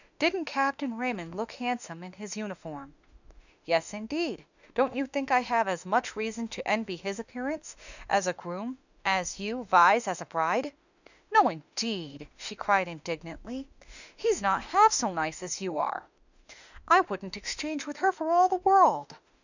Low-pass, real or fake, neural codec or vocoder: 7.2 kHz; fake; autoencoder, 48 kHz, 32 numbers a frame, DAC-VAE, trained on Japanese speech